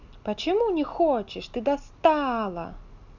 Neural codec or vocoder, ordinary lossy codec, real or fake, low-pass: none; none; real; 7.2 kHz